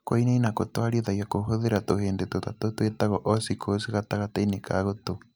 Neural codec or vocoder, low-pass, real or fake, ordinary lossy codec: none; none; real; none